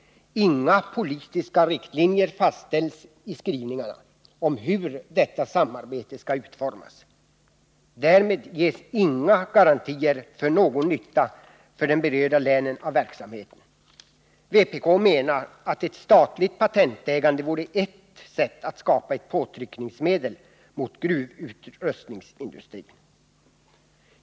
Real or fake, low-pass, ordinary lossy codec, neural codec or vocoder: real; none; none; none